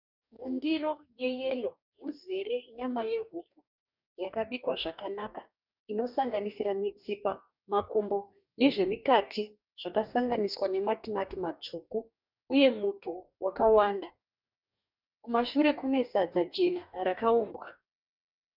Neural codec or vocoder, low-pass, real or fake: codec, 44.1 kHz, 2.6 kbps, DAC; 5.4 kHz; fake